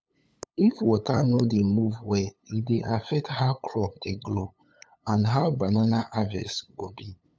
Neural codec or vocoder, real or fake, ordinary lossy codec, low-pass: codec, 16 kHz, 8 kbps, FunCodec, trained on LibriTTS, 25 frames a second; fake; none; none